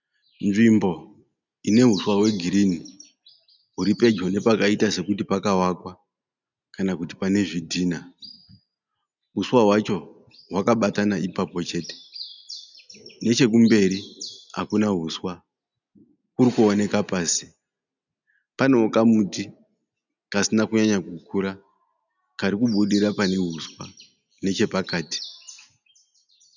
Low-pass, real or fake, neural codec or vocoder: 7.2 kHz; real; none